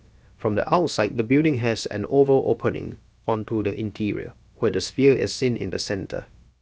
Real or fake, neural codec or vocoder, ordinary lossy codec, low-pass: fake; codec, 16 kHz, about 1 kbps, DyCAST, with the encoder's durations; none; none